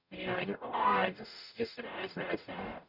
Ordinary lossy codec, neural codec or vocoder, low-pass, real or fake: none; codec, 44.1 kHz, 0.9 kbps, DAC; 5.4 kHz; fake